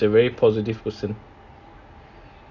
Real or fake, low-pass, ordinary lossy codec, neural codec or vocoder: real; 7.2 kHz; none; none